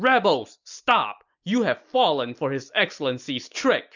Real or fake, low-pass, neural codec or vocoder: real; 7.2 kHz; none